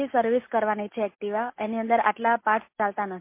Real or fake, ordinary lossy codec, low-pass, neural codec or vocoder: real; MP3, 24 kbps; 3.6 kHz; none